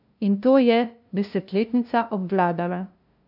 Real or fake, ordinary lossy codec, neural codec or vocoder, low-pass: fake; none; codec, 16 kHz, 0.5 kbps, FunCodec, trained on LibriTTS, 25 frames a second; 5.4 kHz